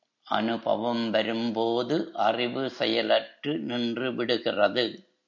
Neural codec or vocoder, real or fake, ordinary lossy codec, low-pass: none; real; MP3, 64 kbps; 7.2 kHz